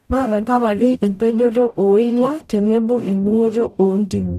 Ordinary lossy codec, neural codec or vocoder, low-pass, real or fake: none; codec, 44.1 kHz, 0.9 kbps, DAC; 14.4 kHz; fake